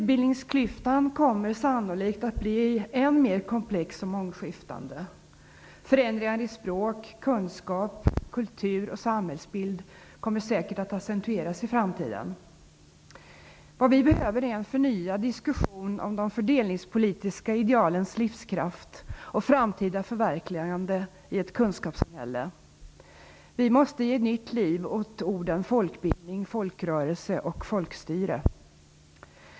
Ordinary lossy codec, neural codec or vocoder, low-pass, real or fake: none; none; none; real